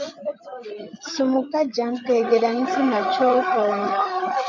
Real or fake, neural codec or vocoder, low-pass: fake; codec, 16 kHz, 8 kbps, FreqCodec, larger model; 7.2 kHz